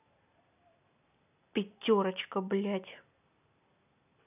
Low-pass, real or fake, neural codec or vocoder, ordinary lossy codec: 3.6 kHz; real; none; none